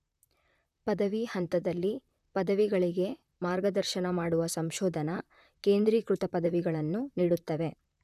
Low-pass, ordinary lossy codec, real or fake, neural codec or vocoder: 14.4 kHz; none; fake; vocoder, 44.1 kHz, 128 mel bands, Pupu-Vocoder